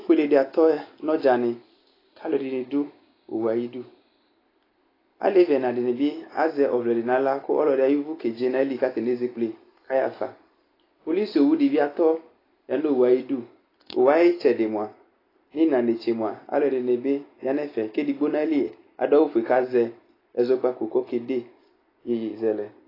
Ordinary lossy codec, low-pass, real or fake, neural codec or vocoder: AAC, 24 kbps; 5.4 kHz; real; none